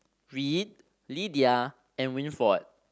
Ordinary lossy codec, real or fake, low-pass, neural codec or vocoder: none; real; none; none